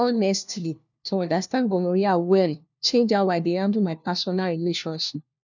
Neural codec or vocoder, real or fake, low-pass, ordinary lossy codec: codec, 16 kHz, 1 kbps, FunCodec, trained on LibriTTS, 50 frames a second; fake; 7.2 kHz; none